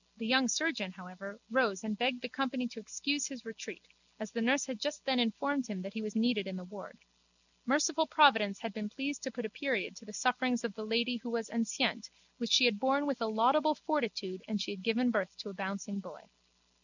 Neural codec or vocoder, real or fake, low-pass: none; real; 7.2 kHz